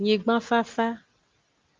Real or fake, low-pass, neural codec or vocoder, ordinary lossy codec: real; 7.2 kHz; none; Opus, 24 kbps